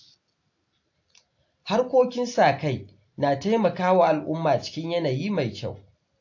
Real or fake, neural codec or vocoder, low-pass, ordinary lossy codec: real; none; 7.2 kHz; AAC, 48 kbps